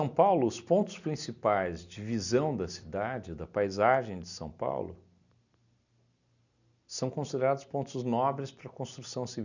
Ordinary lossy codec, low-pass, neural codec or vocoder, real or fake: none; 7.2 kHz; none; real